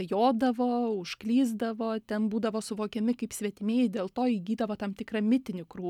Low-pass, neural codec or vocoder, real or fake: 19.8 kHz; none; real